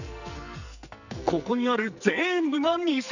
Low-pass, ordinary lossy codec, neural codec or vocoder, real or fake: 7.2 kHz; none; codec, 44.1 kHz, 2.6 kbps, SNAC; fake